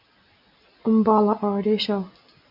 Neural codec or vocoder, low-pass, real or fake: none; 5.4 kHz; real